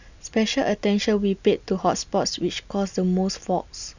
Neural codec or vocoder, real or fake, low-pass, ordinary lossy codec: none; real; 7.2 kHz; none